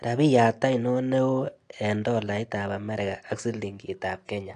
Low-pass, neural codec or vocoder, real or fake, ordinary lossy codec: 9.9 kHz; none; real; AAC, 48 kbps